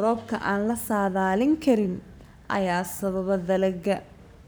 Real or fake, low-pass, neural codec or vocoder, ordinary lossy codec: fake; none; codec, 44.1 kHz, 7.8 kbps, Pupu-Codec; none